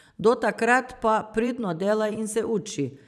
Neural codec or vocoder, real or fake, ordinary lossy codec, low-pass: vocoder, 44.1 kHz, 128 mel bands every 512 samples, BigVGAN v2; fake; none; 14.4 kHz